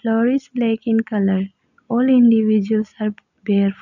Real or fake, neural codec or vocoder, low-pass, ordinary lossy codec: real; none; 7.2 kHz; none